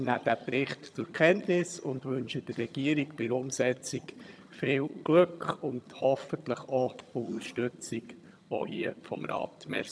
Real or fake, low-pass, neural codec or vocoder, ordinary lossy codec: fake; none; vocoder, 22.05 kHz, 80 mel bands, HiFi-GAN; none